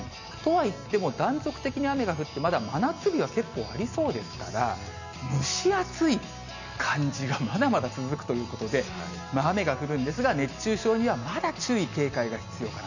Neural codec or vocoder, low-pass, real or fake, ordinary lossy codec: none; 7.2 kHz; real; none